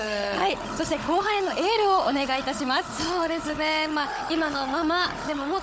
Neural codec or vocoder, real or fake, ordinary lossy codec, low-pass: codec, 16 kHz, 16 kbps, FunCodec, trained on Chinese and English, 50 frames a second; fake; none; none